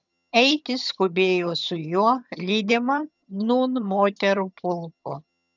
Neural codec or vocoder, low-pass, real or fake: vocoder, 22.05 kHz, 80 mel bands, HiFi-GAN; 7.2 kHz; fake